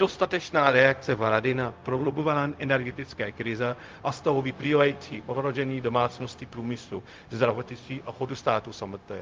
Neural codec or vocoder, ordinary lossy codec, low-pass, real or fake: codec, 16 kHz, 0.4 kbps, LongCat-Audio-Codec; Opus, 32 kbps; 7.2 kHz; fake